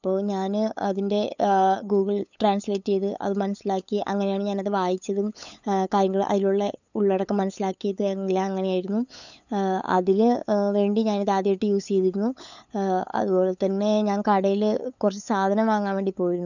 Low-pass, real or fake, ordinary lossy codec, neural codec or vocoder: 7.2 kHz; fake; none; codec, 16 kHz, 8 kbps, FunCodec, trained on LibriTTS, 25 frames a second